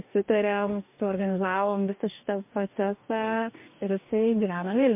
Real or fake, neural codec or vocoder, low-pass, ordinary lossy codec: fake; codec, 44.1 kHz, 2.6 kbps, DAC; 3.6 kHz; MP3, 32 kbps